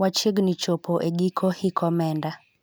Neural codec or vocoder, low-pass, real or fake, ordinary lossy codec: none; none; real; none